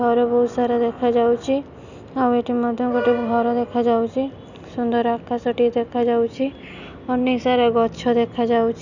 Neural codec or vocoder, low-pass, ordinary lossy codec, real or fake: none; 7.2 kHz; none; real